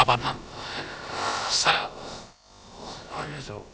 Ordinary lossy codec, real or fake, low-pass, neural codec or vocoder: none; fake; none; codec, 16 kHz, about 1 kbps, DyCAST, with the encoder's durations